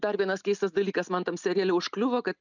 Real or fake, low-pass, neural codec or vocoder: real; 7.2 kHz; none